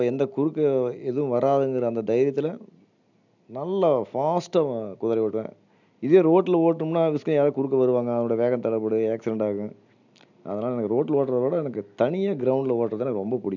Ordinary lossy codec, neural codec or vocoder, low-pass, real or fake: none; none; 7.2 kHz; real